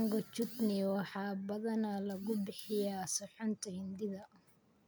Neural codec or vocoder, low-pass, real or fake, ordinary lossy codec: none; none; real; none